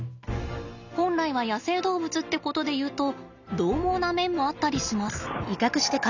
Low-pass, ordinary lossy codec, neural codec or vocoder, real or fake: 7.2 kHz; none; none; real